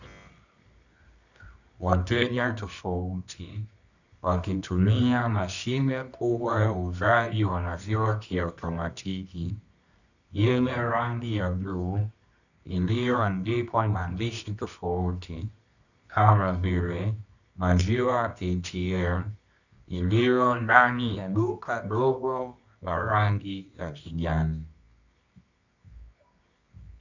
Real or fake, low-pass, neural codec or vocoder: fake; 7.2 kHz; codec, 24 kHz, 0.9 kbps, WavTokenizer, medium music audio release